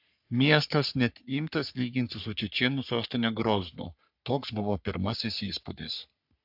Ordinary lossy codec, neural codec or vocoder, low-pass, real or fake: AAC, 48 kbps; codec, 44.1 kHz, 3.4 kbps, Pupu-Codec; 5.4 kHz; fake